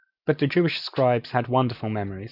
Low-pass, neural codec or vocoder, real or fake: 5.4 kHz; none; real